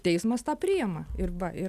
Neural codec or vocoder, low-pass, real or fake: none; 14.4 kHz; real